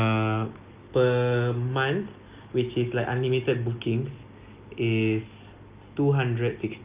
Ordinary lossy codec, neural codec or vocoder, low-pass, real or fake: Opus, 24 kbps; none; 3.6 kHz; real